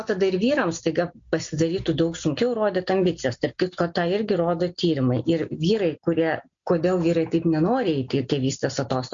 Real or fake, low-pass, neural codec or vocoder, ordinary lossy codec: real; 7.2 kHz; none; MP3, 48 kbps